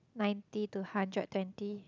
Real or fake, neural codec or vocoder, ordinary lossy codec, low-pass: real; none; none; 7.2 kHz